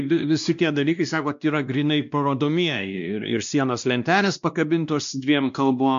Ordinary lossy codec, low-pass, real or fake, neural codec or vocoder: MP3, 64 kbps; 7.2 kHz; fake; codec, 16 kHz, 1 kbps, X-Codec, WavLM features, trained on Multilingual LibriSpeech